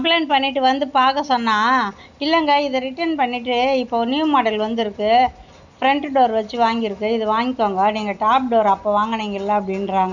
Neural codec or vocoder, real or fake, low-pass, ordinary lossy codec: none; real; 7.2 kHz; none